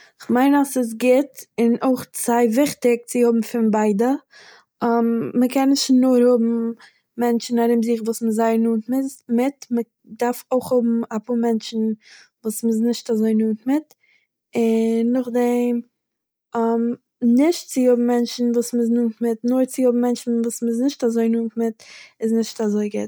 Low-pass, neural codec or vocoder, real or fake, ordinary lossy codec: none; none; real; none